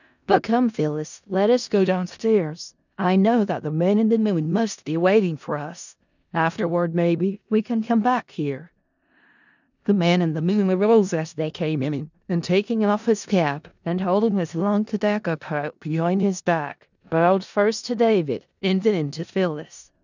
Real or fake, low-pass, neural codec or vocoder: fake; 7.2 kHz; codec, 16 kHz in and 24 kHz out, 0.4 kbps, LongCat-Audio-Codec, four codebook decoder